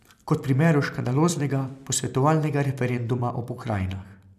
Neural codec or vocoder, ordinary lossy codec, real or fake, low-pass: vocoder, 44.1 kHz, 128 mel bands every 256 samples, BigVGAN v2; none; fake; 14.4 kHz